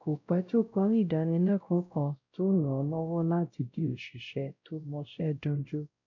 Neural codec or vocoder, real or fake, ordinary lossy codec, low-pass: codec, 16 kHz, 0.5 kbps, X-Codec, WavLM features, trained on Multilingual LibriSpeech; fake; none; 7.2 kHz